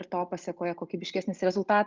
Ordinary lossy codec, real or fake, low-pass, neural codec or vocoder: Opus, 32 kbps; real; 7.2 kHz; none